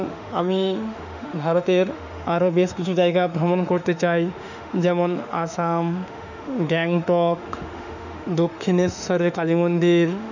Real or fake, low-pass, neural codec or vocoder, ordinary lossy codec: fake; 7.2 kHz; autoencoder, 48 kHz, 32 numbers a frame, DAC-VAE, trained on Japanese speech; none